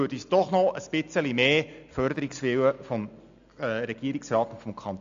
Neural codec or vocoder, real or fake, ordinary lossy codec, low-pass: none; real; AAC, 64 kbps; 7.2 kHz